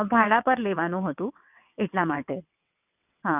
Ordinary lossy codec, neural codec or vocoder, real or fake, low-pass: none; vocoder, 22.05 kHz, 80 mel bands, WaveNeXt; fake; 3.6 kHz